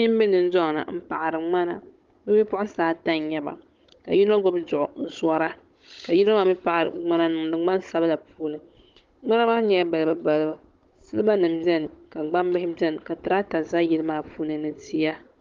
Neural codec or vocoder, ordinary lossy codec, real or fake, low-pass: codec, 16 kHz, 16 kbps, FunCodec, trained on Chinese and English, 50 frames a second; Opus, 32 kbps; fake; 7.2 kHz